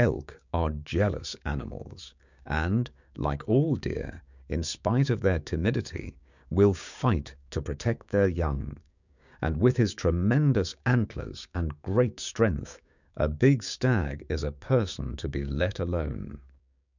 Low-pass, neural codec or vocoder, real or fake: 7.2 kHz; codec, 16 kHz, 6 kbps, DAC; fake